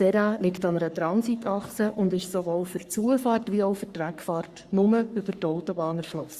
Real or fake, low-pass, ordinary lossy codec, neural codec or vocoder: fake; 14.4 kHz; Opus, 64 kbps; codec, 44.1 kHz, 3.4 kbps, Pupu-Codec